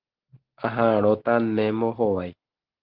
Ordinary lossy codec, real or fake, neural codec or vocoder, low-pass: Opus, 16 kbps; real; none; 5.4 kHz